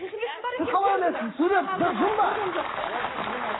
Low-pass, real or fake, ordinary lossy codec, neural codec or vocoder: 7.2 kHz; fake; AAC, 16 kbps; codec, 44.1 kHz, 7.8 kbps, Pupu-Codec